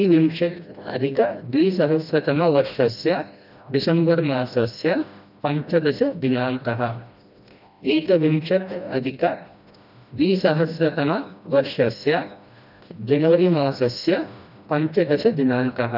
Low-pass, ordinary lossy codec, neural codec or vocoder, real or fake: 5.4 kHz; none; codec, 16 kHz, 1 kbps, FreqCodec, smaller model; fake